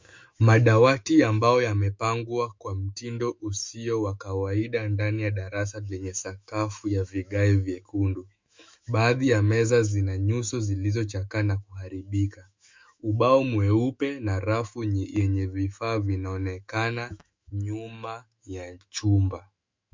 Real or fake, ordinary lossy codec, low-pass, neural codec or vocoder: fake; MP3, 64 kbps; 7.2 kHz; autoencoder, 48 kHz, 128 numbers a frame, DAC-VAE, trained on Japanese speech